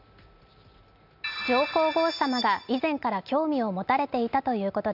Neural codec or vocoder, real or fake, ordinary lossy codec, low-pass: none; real; none; 5.4 kHz